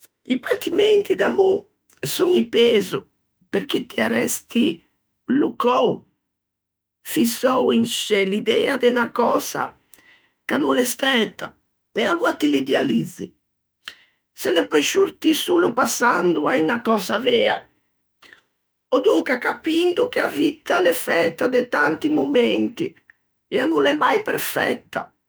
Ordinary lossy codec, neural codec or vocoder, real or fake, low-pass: none; autoencoder, 48 kHz, 32 numbers a frame, DAC-VAE, trained on Japanese speech; fake; none